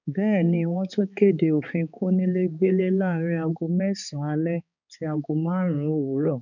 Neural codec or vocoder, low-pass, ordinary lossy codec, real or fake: codec, 16 kHz, 4 kbps, X-Codec, HuBERT features, trained on balanced general audio; 7.2 kHz; none; fake